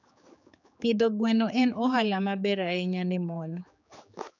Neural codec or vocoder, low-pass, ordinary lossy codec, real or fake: codec, 16 kHz, 4 kbps, X-Codec, HuBERT features, trained on general audio; 7.2 kHz; none; fake